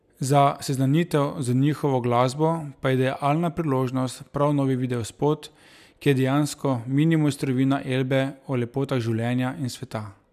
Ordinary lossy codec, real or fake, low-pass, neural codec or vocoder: none; real; 14.4 kHz; none